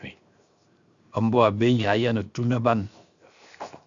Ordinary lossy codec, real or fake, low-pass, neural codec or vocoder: Opus, 64 kbps; fake; 7.2 kHz; codec, 16 kHz, 0.7 kbps, FocalCodec